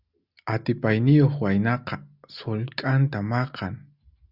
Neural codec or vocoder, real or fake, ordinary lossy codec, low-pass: none; real; Opus, 64 kbps; 5.4 kHz